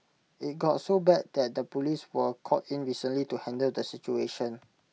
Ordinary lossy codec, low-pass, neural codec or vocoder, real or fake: none; none; none; real